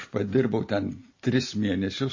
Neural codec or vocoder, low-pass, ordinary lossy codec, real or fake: none; 7.2 kHz; MP3, 32 kbps; real